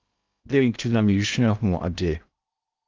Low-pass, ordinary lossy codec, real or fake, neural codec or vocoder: 7.2 kHz; Opus, 32 kbps; fake; codec, 16 kHz in and 24 kHz out, 0.6 kbps, FocalCodec, streaming, 2048 codes